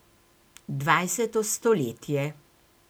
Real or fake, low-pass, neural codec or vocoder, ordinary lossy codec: real; none; none; none